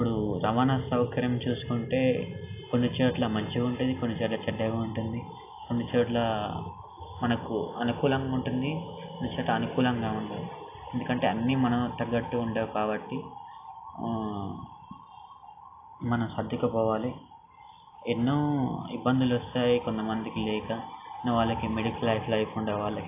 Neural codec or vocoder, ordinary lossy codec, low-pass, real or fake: none; AAC, 24 kbps; 3.6 kHz; real